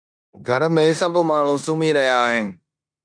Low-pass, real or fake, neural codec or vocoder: 9.9 kHz; fake; codec, 16 kHz in and 24 kHz out, 0.9 kbps, LongCat-Audio-Codec, four codebook decoder